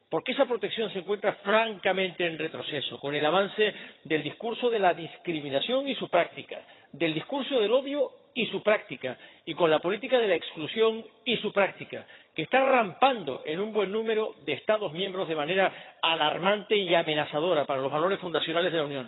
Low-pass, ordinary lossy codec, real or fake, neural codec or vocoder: 7.2 kHz; AAC, 16 kbps; fake; vocoder, 22.05 kHz, 80 mel bands, HiFi-GAN